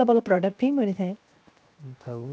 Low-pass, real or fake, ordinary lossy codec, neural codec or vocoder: none; fake; none; codec, 16 kHz, 0.7 kbps, FocalCodec